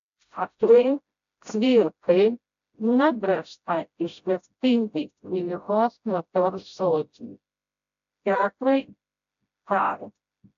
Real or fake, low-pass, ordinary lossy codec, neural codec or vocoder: fake; 7.2 kHz; AAC, 64 kbps; codec, 16 kHz, 0.5 kbps, FreqCodec, smaller model